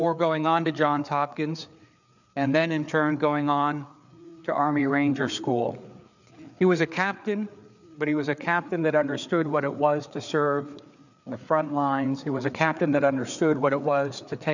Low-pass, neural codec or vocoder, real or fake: 7.2 kHz; codec, 16 kHz, 4 kbps, FreqCodec, larger model; fake